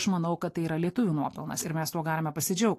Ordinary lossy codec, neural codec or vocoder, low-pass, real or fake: AAC, 48 kbps; none; 14.4 kHz; real